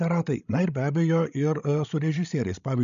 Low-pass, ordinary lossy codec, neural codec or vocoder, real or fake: 7.2 kHz; AAC, 96 kbps; codec, 16 kHz, 16 kbps, FreqCodec, larger model; fake